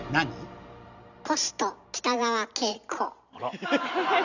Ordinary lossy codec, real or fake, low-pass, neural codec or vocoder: none; real; 7.2 kHz; none